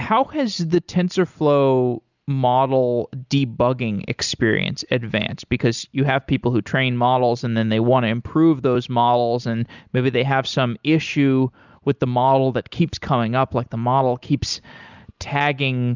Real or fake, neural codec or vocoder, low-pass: real; none; 7.2 kHz